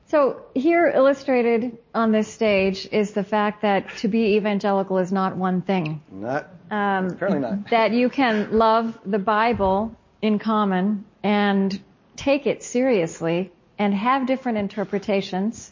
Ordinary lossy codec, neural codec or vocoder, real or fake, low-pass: MP3, 32 kbps; none; real; 7.2 kHz